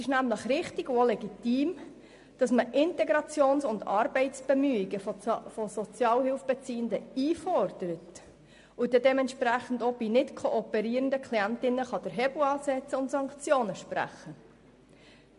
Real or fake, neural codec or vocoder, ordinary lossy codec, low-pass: real; none; MP3, 48 kbps; 10.8 kHz